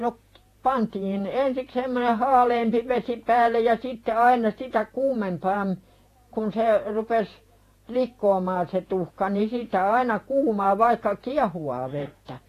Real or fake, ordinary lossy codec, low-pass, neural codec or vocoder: fake; AAC, 48 kbps; 14.4 kHz; vocoder, 48 kHz, 128 mel bands, Vocos